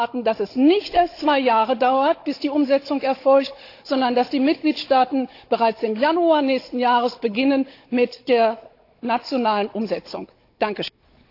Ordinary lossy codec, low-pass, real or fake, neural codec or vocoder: AAC, 32 kbps; 5.4 kHz; fake; codec, 16 kHz, 16 kbps, FunCodec, trained on Chinese and English, 50 frames a second